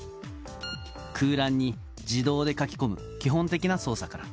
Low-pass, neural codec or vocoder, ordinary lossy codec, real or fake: none; none; none; real